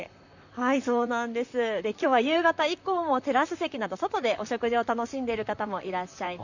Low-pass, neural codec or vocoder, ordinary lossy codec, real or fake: 7.2 kHz; vocoder, 44.1 kHz, 128 mel bands, Pupu-Vocoder; none; fake